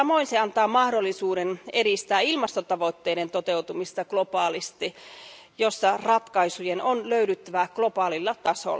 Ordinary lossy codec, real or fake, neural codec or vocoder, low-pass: none; real; none; none